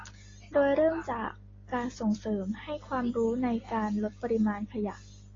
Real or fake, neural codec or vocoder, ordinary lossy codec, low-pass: real; none; AAC, 32 kbps; 7.2 kHz